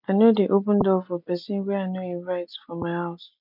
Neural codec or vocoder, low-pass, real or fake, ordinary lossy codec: none; 5.4 kHz; real; none